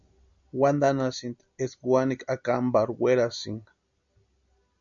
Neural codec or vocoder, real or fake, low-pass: none; real; 7.2 kHz